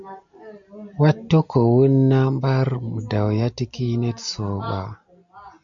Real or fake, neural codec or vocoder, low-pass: real; none; 7.2 kHz